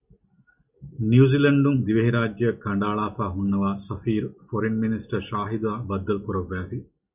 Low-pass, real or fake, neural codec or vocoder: 3.6 kHz; real; none